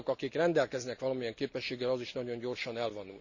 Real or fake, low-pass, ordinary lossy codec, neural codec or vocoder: real; 7.2 kHz; none; none